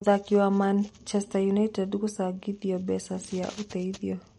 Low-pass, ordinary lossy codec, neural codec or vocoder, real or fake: 19.8 kHz; MP3, 48 kbps; none; real